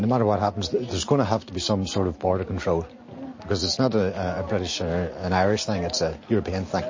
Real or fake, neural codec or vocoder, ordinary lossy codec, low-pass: real; none; MP3, 32 kbps; 7.2 kHz